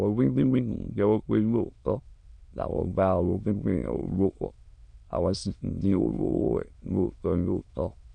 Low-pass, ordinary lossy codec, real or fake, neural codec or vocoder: 9.9 kHz; none; fake; autoencoder, 22.05 kHz, a latent of 192 numbers a frame, VITS, trained on many speakers